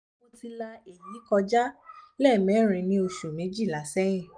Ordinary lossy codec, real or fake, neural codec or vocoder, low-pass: none; real; none; 9.9 kHz